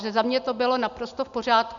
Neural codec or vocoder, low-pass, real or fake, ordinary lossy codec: none; 7.2 kHz; real; MP3, 96 kbps